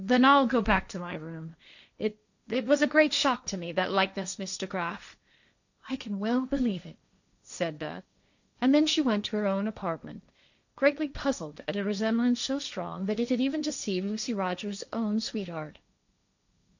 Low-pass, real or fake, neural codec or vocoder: 7.2 kHz; fake; codec, 16 kHz, 1.1 kbps, Voila-Tokenizer